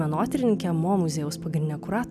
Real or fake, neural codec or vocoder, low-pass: real; none; 14.4 kHz